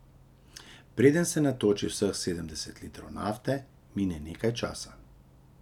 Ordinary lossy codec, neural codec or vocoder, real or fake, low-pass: none; none; real; 19.8 kHz